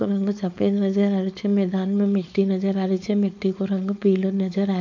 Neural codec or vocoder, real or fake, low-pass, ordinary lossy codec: codec, 16 kHz, 4.8 kbps, FACodec; fake; 7.2 kHz; none